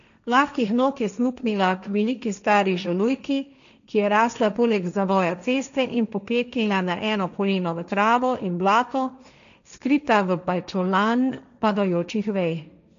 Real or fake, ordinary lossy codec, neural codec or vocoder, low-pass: fake; none; codec, 16 kHz, 1.1 kbps, Voila-Tokenizer; 7.2 kHz